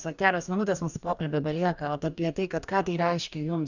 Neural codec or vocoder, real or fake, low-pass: codec, 44.1 kHz, 2.6 kbps, DAC; fake; 7.2 kHz